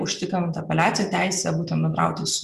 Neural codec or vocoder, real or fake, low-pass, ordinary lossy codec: vocoder, 44.1 kHz, 128 mel bands every 256 samples, BigVGAN v2; fake; 14.4 kHz; Opus, 64 kbps